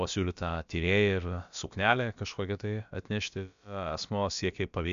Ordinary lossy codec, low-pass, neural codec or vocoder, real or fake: MP3, 64 kbps; 7.2 kHz; codec, 16 kHz, about 1 kbps, DyCAST, with the encoder's durations; fake